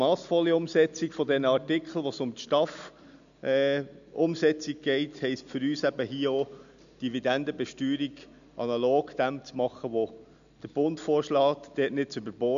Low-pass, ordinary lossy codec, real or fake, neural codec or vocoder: 7.2 kHz; none; real; none